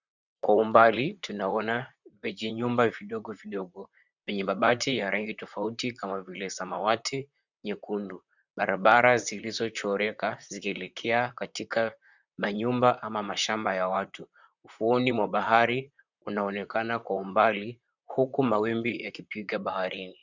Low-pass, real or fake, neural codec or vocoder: 7.2 kHz; fake; vocoder, 44.1 kHz, 128 mel bands, Pupu-Vocoder